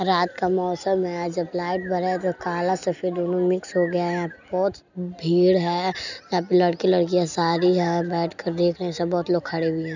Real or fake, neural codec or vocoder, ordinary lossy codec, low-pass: real; none; none; 7.2 kHz